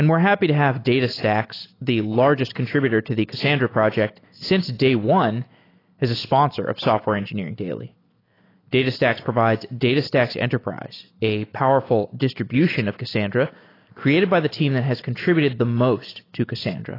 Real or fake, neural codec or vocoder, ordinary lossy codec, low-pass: real; none; AAC, 24 kbps; 5.4 kHz